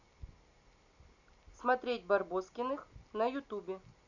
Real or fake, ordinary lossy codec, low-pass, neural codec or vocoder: real; none; 7.2 kHz; none